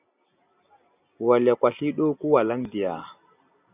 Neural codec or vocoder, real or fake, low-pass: none; real; 3.6 kHz